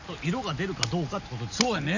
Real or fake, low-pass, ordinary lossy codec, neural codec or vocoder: real; 7.2 kHz; none; none